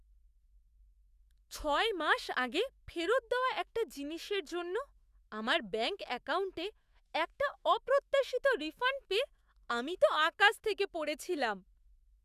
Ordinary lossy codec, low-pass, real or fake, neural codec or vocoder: Opus, 64 kbps; 14.4 kHz; fake; autoencoder, 48 kHz, 128 numbers a frame, DAC-VAE, trained on Japanese speech